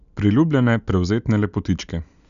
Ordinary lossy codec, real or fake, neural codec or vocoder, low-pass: none; real; none; 7.2 kHz